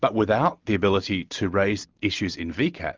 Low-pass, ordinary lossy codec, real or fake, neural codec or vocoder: 7.2 kHz; Opus, 24 kbps; real; none